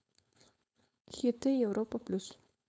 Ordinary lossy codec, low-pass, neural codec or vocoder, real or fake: none; none; codec, 16 kHz, 4.8 kbps, FACodec; fake